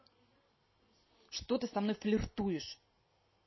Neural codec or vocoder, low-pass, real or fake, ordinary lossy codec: none; 7.2 kHz; real; MP3, 24 kbps